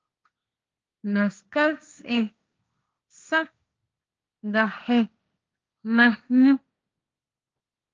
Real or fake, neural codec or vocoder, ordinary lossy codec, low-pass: fake; codec, 16 kHz, 1.1 kbps, Voila-Tokenizer; Opus, 24 kbps; 7.2 kHz